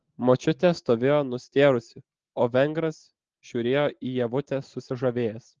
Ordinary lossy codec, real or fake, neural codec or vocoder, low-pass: Opus, 16 kbps; real; none; 7.2 kHz